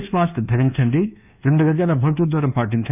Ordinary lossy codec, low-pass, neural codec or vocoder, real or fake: none; 3.6 kHz; codec, 24 kHz, 1.2 kbps, DualCodec; fake